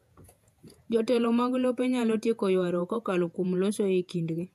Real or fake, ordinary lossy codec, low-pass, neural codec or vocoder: fake; AAC, 96 kbps; 14.4 kHz; vocoder, 48 kHz, 128 mel bands, Vocos